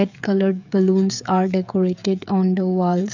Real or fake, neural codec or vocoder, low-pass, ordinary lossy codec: fake; codec, 16 kHz, 16 kbps, FreqCodec, smaller model; 7.2 kHz; none